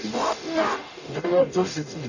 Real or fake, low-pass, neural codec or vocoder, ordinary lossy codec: fake; 7.2 kHz; codec, 44.1 kHz, 0.9 kbps, DAC; none